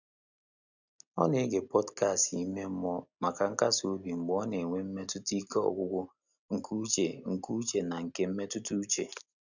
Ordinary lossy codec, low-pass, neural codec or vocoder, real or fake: none; 7.2 kHz; none; real